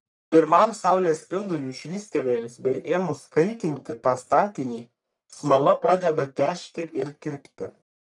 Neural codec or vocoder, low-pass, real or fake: codec, 44.1 kHz, 1.7 kbps, Pupu-Codec; 10.8 kHz; fake